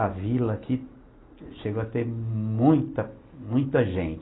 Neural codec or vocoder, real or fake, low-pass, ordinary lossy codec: none; real; 7.2 kHz; AAC, 16 kbps